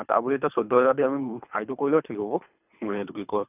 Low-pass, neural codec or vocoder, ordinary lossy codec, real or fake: 3.6 kHz; codec, 24 kHz, 3 kbps, HILCodec; none; fake